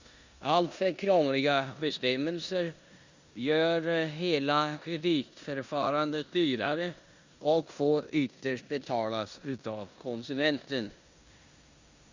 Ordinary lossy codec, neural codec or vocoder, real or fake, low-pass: Opus, 64 kbps; codec, 16 kHz in and 24 kHz out, 0.9 kbps, LongCat-Audio-Codec, four codebook decoder; fake; 7.2 kHz